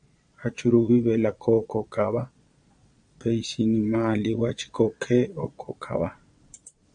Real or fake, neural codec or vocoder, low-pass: fake; vocoder, 22.05 kHz, 80 mel bands, Vocos; 9.9 kHz